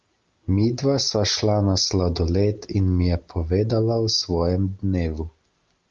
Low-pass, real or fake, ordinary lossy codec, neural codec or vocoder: 7.2 kHz; real; Opus, 32 kbps; none